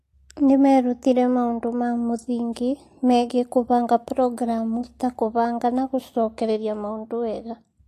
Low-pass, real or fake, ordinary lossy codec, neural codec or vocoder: 14.4 kHz; real; AAC, 48 kbps; none